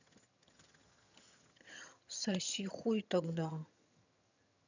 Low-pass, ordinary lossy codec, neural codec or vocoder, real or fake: 7.2 kHz; none; vocoder, 22.05 kHz, 80 mel bands, HiFi-GAN; fake